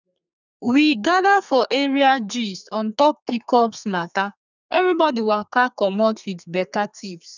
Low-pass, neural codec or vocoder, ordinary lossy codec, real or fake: 7.2 kHz; codec, 32 kHz, 1.9 kbps, SNAC; none; fake